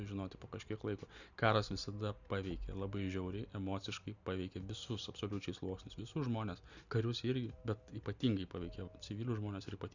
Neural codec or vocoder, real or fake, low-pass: none; real; 7.2 kHz